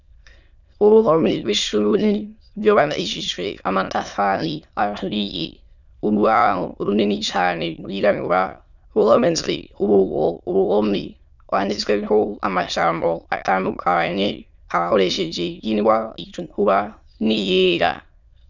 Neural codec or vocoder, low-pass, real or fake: autoencoder, 22.05 kHz, a latent of 192 numbers a frame, VITS, trained on many speakers; 7.2 kHz; fake